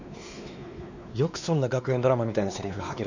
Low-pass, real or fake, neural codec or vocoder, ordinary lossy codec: 7.2 kHz; fake; codec, 16 kHz, 2 kbps, X-Codec, WavLM features, trained on Multilingual LibriSpeech; none